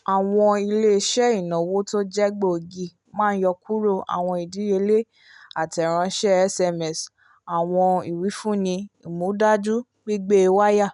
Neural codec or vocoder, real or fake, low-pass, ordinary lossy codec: none; real; 10.8 kHz; none